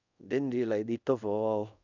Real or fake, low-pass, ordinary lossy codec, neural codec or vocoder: fake; 7.2 kHz; none; codec, 24 kHz, 0.5 kbps, DualCodec